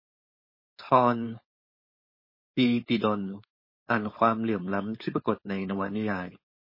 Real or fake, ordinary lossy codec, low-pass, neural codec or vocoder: fake; MP3, 24 kbps; 5.4 kHz; codec, 16 kHz, 4.8 kbps, FACodec